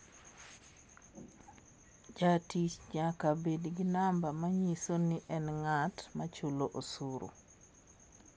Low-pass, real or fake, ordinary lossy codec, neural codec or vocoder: none; real; none; none